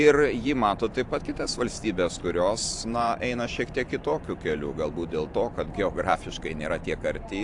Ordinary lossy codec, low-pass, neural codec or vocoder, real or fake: AAC, 64 kbps; 10.8 kHz; vocoder, 44.1 kHz, 128 mel bands every 512 samples, BigVGAN v2; fake